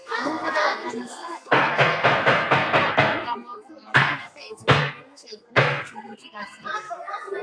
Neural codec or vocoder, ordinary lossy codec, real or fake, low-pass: codec, 32 kHz, 1.9 kbps, SNAC; AAC, 64 kbps; fake; 9.9 kHz